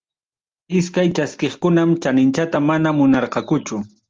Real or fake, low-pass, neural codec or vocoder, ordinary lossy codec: real; 7.2 kHz; none; Opus, 32 kbps